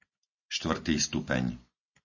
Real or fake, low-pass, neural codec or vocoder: real; 7.2 kHz; none